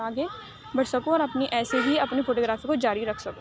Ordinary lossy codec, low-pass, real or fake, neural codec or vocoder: none; none; real; none